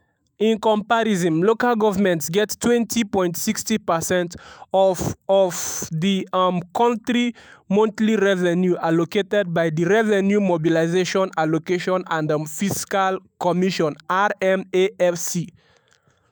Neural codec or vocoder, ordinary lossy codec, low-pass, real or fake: autoencoder, 48 kHz, 128 numbers a frame, DAC-VAE, trained on Japanese speech; none; none; fake